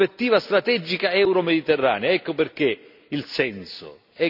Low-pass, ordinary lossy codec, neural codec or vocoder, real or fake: 5.4 kHz; none; none; real